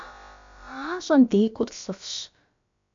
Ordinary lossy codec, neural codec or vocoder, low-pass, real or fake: MP3, 64 kbps; codec, 16 kHz, about 1 kbps, DyCAST, with the encoder's durations; 7.2 kHz; fake